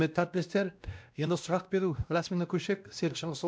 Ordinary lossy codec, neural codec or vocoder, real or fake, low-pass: none; codec, 16 kHz, 1 kbps, X-Codec, WavLM features, trained on Multilingual LibriSpeech; fake; none